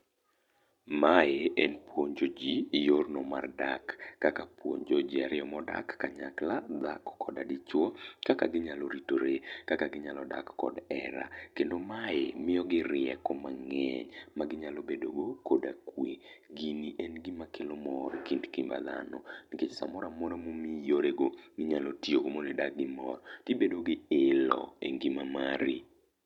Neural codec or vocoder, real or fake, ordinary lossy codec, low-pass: none; real; none; 19.8 kHz